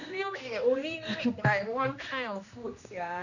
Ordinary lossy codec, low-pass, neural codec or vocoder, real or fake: none; 7.2 kHz; codec, 16 kHz, 1 kbps, X-Codec, HuBERT features, trained on general audio; fake